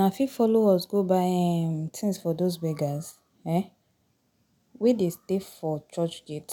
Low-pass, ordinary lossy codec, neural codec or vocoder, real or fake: 19.8 kHz; none; none; real